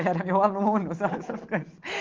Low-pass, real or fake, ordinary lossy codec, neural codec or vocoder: 7.2 kHz; real; Opus, 16 kbps; none